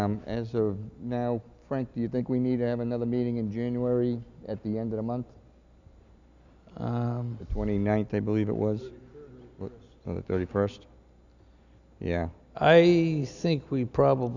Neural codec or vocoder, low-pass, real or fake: none; 7.2 kHz; real